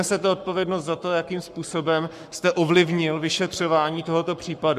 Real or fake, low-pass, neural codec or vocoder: fake; 14.4 kHz; codec, 44.1 kHz, 7.8 kbps, Pupu-Codec